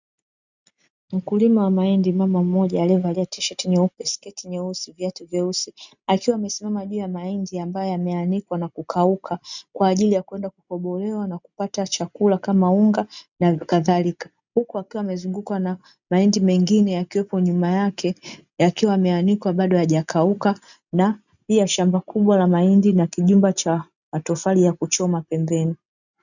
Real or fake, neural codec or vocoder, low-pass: real; none; 7.2 kHz